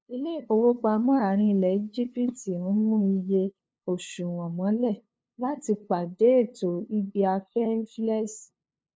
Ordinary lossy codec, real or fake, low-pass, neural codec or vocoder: none; fake; none; codec, 16 kHz, 2 kbps, FunCodec, trained on LibriTTS, 25 frames a second